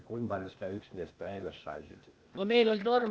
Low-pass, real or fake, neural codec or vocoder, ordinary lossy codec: none; fake; codec, 16 kHz, 0.8 kbps, ZipCodec; none